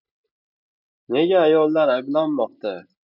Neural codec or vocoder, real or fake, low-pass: none; real; 5.4 kHz